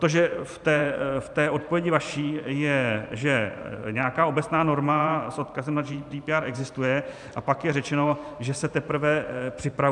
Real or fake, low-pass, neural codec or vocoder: fake; 10.8 kHz; vocoder, 44.1 kHz, 128 mel bands every 512 samples, BigVGAN v2